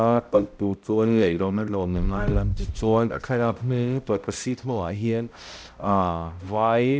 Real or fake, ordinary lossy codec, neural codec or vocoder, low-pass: fake; none; codec, 16 kHz, 0.5 kbps, X-Codec, HuBERT features, trained on balanced general audio; none